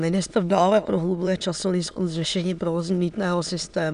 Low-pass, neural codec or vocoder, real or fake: 9.9 kHz; autoencoder, 22.05 kHz, a latent of 192 numbers a frame, VITS, trained on many speakers; fake